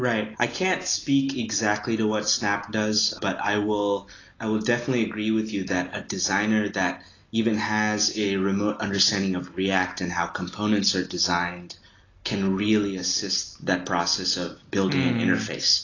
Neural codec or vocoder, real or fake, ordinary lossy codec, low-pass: none; real; AAC, 32 kbps; 7.2 kHz